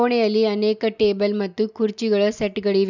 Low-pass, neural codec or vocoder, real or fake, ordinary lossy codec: 7.2 kHz; none; real; none